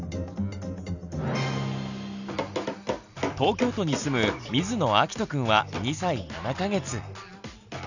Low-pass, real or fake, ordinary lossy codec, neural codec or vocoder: 7.2 kHz; real; none; none